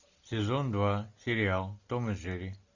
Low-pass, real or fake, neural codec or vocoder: 7.2 kHz; real; none